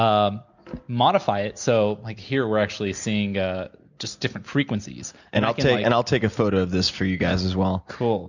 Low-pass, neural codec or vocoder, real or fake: 7.2 kHz; none; real